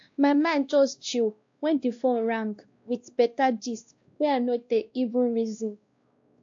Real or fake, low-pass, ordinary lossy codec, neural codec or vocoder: fake; 7.2 kHz; AAC, 48 kbps; codec, 16 kHz, 1 kbps, X-Codec, WavLM features, trained on Multilingual LibriSpeech